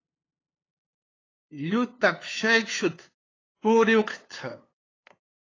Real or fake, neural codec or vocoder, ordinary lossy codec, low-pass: fake; codec, 16 kHz, 2 kbps, FunCodec, trained on LibriTTS, 25 frames a second; AAC, 32 kbps; 7.2 kHz